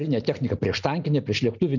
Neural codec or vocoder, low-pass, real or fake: none; 7.2 kHz; real